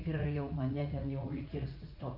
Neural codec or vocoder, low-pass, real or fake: vocoder, 44.1 kHz, 80 mel bands, Vocos; 5.4 kHz; fake